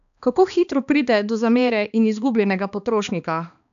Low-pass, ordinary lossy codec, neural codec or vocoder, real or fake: 7.2 kHz; AAC, 96 kbps; codec, 16 kHz, 2 kbps, X-Codec, HuBERT features, trained on balanced general audio; fake